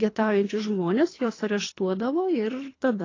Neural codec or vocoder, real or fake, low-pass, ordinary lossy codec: codec, 24 kHz, 3 kbps, HILCodec; fake; 7.2 kHz; AAC, 32 kbps